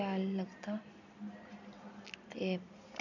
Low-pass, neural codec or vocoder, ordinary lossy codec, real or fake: 7.2 kHz; none; none; real